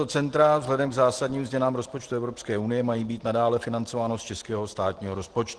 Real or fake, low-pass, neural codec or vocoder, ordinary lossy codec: real; 10.8 kHz; none; Opus, 16 kbps